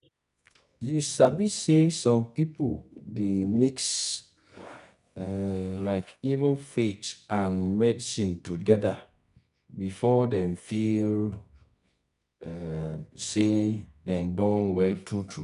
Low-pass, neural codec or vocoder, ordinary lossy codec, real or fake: 10.8 kHz; codec, 24 kHz, 0.9 kbps, WavTokenizer, medium music audio release; none; fake